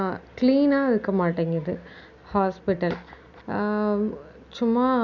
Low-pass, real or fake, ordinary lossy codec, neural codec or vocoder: 7.2 kHz; real; none; none